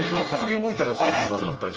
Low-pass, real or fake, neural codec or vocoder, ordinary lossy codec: 7.2 kHz; fake; codec, 24 kHz, 1 kbps, SNAC; Opus, 24 kbps